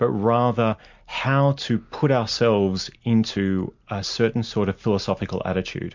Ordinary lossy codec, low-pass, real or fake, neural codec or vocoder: MP3, 64 kbps; 7.2 kHz; real; none